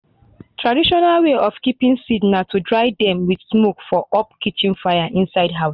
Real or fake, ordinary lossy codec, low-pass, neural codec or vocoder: real; none; 5.4 kHz; none